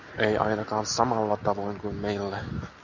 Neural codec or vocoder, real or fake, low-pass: none; real; 7.2 kHz